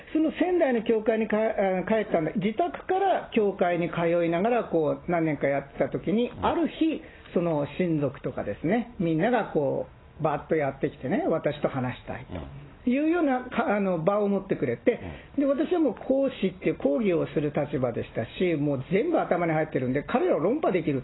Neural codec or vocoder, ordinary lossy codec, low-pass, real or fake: none; AAC, 16 kbps; 7.2 kHz; real